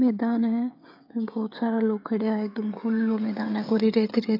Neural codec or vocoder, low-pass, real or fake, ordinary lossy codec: codec, 16 kHz, 16 kbps, FreqCodec, smaller model; 5.4 kHz; fake; AAC, 32 kbps